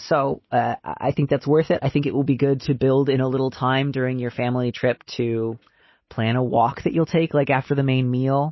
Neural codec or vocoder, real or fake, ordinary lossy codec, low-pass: none; real; MP3, 24 kbps; 7.2 kHz